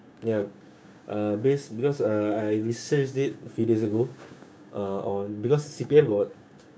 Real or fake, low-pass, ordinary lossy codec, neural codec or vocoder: fake; none; none; codec, 16 kHz, 6 kbps, DAC